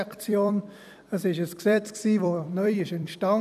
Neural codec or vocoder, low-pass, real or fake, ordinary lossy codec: vocoder, 44.1 kHz, 128 mel bands every 256 samples, BigVGAN v2; 14.4 kHz; fake; none